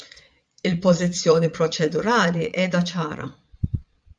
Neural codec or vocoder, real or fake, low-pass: vocoder, 22.05 kHz, 80 mel bands, Vocos; fake; 9.9 kHz